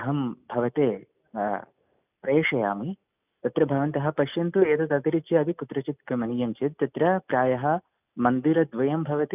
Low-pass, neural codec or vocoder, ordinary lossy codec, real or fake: 3.6 kHz; none; none; real